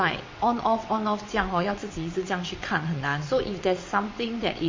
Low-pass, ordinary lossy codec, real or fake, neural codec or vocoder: 7.2 kHz; MP3, 32 kbps; fake; vocoder, 22.05 kHz, 80 mel bands, WaveNeXt